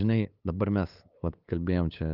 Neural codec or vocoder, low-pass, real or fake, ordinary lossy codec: codec, 16 kHz, 8 kbps, FunCodec, trained on LibriTTS, 25 frames a second; 5.4 kHz; fake; Opus, 32 kbps